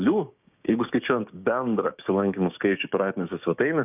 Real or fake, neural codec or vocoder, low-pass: fake; codec, 16 kHz, 6 kbps, DAC; 3.6 kHz